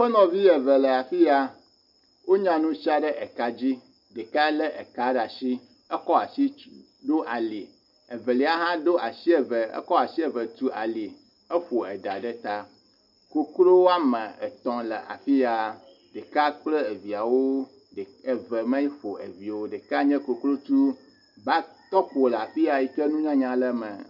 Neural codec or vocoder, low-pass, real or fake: none; 5.4 kHz; real